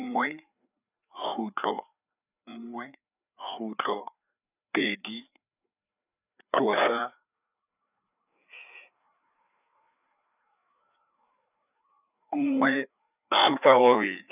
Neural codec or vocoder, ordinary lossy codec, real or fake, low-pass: codec, 16 kHz, 4 kbps, FreqCodec, larger model; none; fake; 3.6 kHz